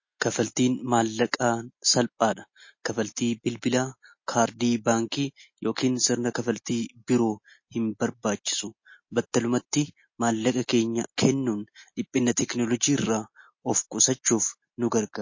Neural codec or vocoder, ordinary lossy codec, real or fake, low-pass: none; MP3, 32 kbps; real; 7.2 kHz